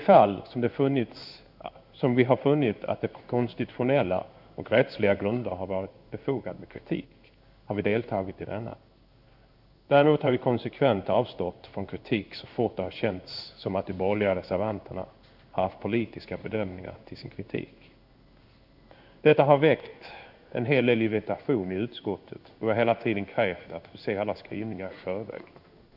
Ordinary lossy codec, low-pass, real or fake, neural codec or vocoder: none; 5.4 kHz; fake; codec, 16 kHz in and 24 kHz out, 1 kbps, XY-Tokenizer